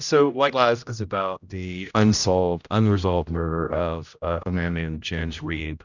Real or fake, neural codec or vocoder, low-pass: fake; codec, 16 kHz, 0.5 kbps, X-Codec, HuBERT features, trained on general audio; 7.2 kHz